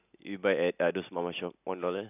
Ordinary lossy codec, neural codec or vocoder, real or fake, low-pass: none; none; real; 3.6 kHz